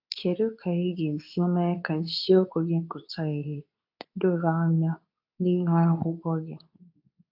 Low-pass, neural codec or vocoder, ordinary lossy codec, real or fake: 5.4 kHz; codec, 24 kHz, 0.9 kbps, WavTokenizer, medium speech release version 2; AAC, 48 kbps; fake